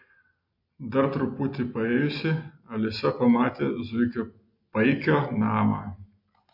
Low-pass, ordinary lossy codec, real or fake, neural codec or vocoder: 5.4 kHz; MP3, 32 kbps; real; none